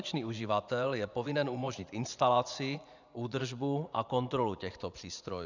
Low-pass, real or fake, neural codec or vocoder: 7.2 kHz; fake; vocoder, 24 kHz, 100 mel bands, Vocos